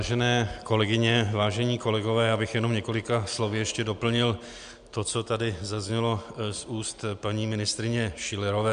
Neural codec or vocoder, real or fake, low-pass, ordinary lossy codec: none; real; 9.9 kHz; MP3, 64 kbps